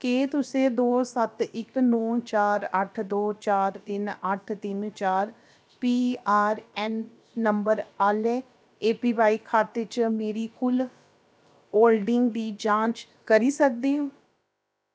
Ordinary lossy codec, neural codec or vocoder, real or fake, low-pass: none; codec, 16 kHz, about 1 kbps, DyCAST, with the encoder's durations; fake; none